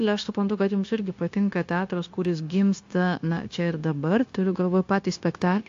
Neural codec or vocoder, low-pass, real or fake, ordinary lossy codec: codec, 16 kHz, 0.9 kbps, LongCat-Audio-Codec; 7.2 kHz; fake; AAC, 48 kbps